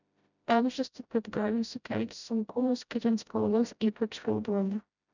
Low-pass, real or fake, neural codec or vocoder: 7.2 kHz; fake; codec, 16 kHz, 0.5 kbps, FreqCodec, smaller model